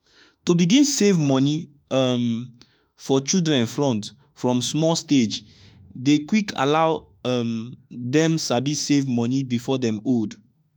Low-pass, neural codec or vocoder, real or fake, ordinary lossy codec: none; autoencoder, 48 kHz, 32 numbers a frame, DAC-VAE, trained on Japanese speech; fake; none